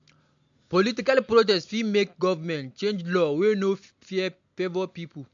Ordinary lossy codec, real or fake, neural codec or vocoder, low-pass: MP3, 64 kbps; real; none; 7.2 kHz